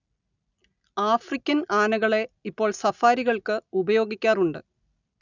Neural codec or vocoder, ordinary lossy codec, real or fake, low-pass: none; none; real; 7.2 kHz